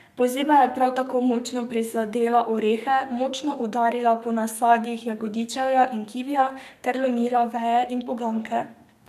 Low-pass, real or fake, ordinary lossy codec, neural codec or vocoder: 14.4 kHz; fake; none; codec, 32 kHz, 1.9 kbps, SNAC